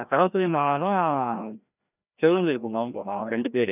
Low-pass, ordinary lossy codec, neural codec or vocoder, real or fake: 3.6 kHz; none; codec, 16 kHz, 1 kbps, FreqCodec, larger model; fake